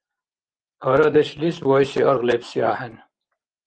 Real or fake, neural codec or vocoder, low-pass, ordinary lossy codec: fake; vocoder, 24 kHz, 100 mel bands, Vocos; 9.9 kHz; Opus, 24 kbps